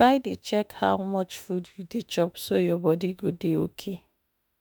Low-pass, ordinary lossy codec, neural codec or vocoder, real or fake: none; none; autoencoder, 48 kHz, 32 numbers a frame, DAC-VAE, trained on Japanese speech; fake